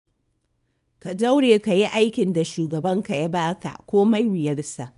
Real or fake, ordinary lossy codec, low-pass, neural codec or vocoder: fake; none; 10.8 kHz; codec, 24 kHz, 0.9 kbps, WavTokenizer, small release